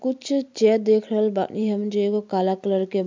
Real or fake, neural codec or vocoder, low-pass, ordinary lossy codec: fake; vocoder, 44.1 kHz, 128 mel bands every 256 samples, BigVGAN v2; 7.2 kHz; MP3, 64 kbps